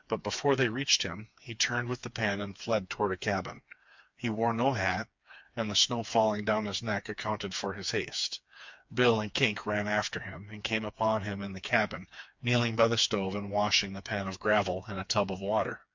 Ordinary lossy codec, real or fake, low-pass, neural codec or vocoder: MP3, 64 kbps; fake; 7.2 kHz; codec, 16 kHz, 4 kbps, FreqCodec, smaller model